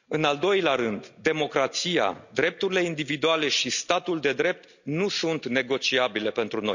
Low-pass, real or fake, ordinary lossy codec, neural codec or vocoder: 7.2 kHz; real; none; none